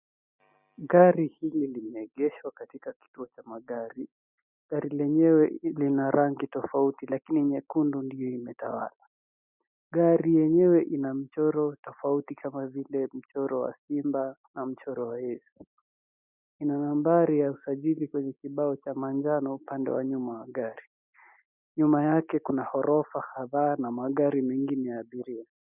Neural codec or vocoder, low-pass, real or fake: none; 3.6 kHz; real